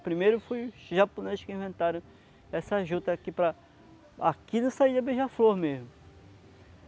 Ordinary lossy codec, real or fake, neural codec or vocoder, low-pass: none; real; none; none